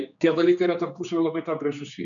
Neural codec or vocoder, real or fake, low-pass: codec, 16 kHz, 4 kbps, X-Codec, HuBERT features, trained on general audio; fake; 7.2 kHz